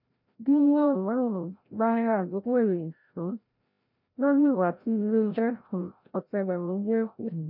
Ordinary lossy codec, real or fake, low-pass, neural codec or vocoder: none; fake; 5.4 kHz; codec, 16 kHz, 0.5 kbps, FreqCodec, larger model